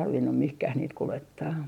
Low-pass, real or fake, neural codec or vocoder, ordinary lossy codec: 19.8 kHz; real; none; MP3, 96 kbps